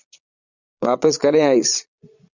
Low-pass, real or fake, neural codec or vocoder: 7.2 kHz; fake; vocoder, 22.05 kHz, 80 mel bands, Vocos